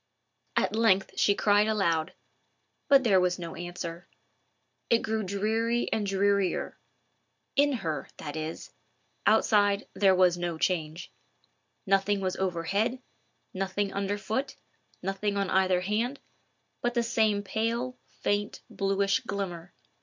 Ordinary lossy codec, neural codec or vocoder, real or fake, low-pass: MP3, 64 kbps; none; real; 7.2 kHz